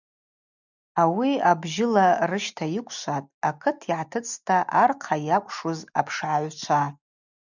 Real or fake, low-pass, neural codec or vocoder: real; 7.2 kHz; none